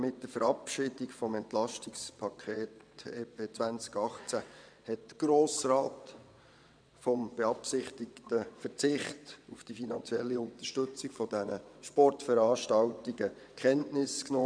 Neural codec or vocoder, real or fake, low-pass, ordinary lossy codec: vocoder, 22.05 kHz, 80 mel bands, WaveNeXt; fake; 9.9 kHz; none